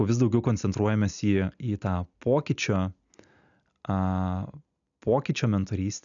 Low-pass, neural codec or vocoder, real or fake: 7.2 kHz; none; real